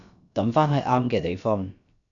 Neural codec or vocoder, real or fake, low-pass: codec, 16 kHz, about 1 kbps, DyCAST, with the encoder's durations; fake; 7.2 kHz